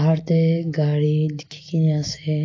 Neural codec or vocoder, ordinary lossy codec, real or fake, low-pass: none; AAC, 32 kbps; real; 7.2 kHz